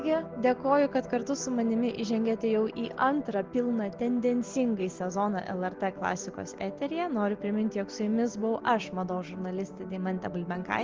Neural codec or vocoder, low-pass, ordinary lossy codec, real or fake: none; 7.2 kHz; Opus, 16 kbps; real